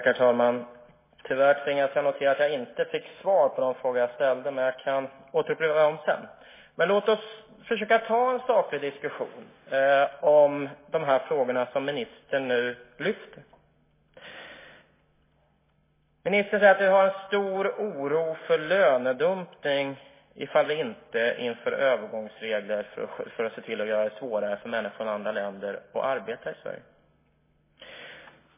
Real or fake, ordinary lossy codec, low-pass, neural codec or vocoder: real; MP3, 16 kbps; 3.6 kHz; none